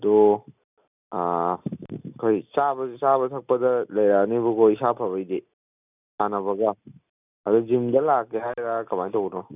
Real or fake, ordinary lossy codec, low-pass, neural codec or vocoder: real; none; 3.6 kHz; none